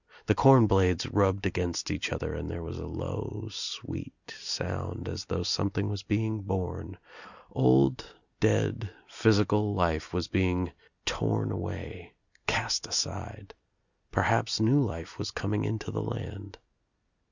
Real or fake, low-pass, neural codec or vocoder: real; 7.2 kHz; none